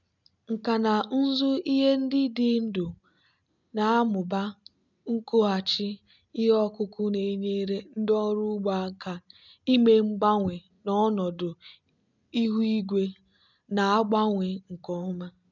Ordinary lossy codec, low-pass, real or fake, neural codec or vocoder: none; 7.2 kHz; real; none